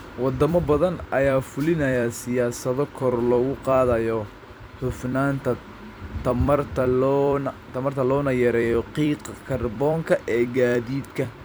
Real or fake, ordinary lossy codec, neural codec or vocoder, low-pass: fake; none; vocoder, 44.1 kHz, 128 mel bands every 256 samples, BigVGAN v2; none